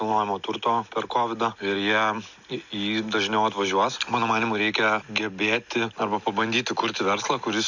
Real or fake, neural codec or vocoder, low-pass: real; none; 7.2 kHz